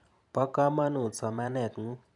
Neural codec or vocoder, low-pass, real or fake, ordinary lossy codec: vocoder, 44.1 kHz, 128 mel bands every 512 samples, BigVGAN v2; 10.8 kHz; fake; none